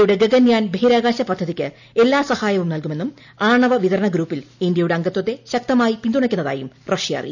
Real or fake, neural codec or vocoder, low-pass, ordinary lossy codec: real; none; 7.2 kHz; none